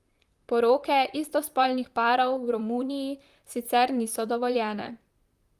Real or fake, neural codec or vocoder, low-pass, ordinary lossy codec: fake; vocoder, 44.1 kHz, 128 mel bands, Pupu-Vocoder; 14.4 kHz; Opus, 32 kbps